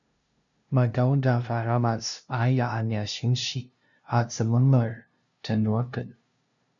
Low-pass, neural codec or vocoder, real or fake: 7.2 kHz; codec, 16 kHz, 0.5 kbps, FunCodec, trained on LibriTTS, 25 frames a second; fake